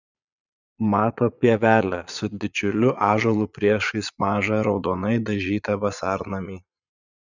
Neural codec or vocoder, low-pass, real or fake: vocoder, 22.05 kHz, 80 mel bands, Vocos; 7.2 kHz; fake